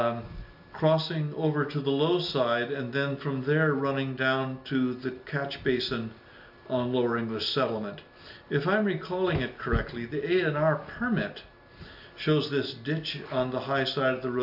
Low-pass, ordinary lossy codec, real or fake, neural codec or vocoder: 5.4 kHz; AAC, 48 kbps; real; none